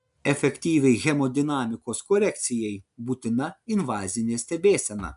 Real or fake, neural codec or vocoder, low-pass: real; none; 10.8 kHz